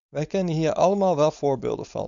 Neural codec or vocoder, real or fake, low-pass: codec, 16 kHz, 4.8 kbps, FACodec; fake; 7.2 kHz